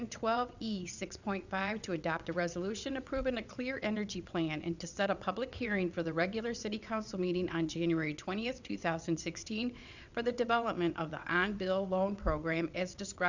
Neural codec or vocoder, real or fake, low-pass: vocoder, 22.05 kHz, 80 mel bands, WaveNeXt; fake; 7.2 kHz